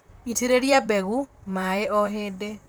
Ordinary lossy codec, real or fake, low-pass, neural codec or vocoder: none; fake; none; vocoder, 44.1 kHz, 128 mel bands, Pupu-Vocoder